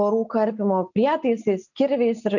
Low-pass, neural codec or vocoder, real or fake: 7.2 kHz; none; real